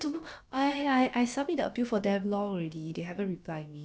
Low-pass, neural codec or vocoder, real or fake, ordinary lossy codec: none; codec, 16 kHz, about 1 kbps, DyCAST, with the encoder's durations; fake; none